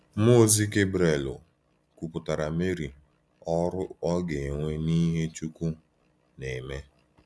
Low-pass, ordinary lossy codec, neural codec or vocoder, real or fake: none; none; none; real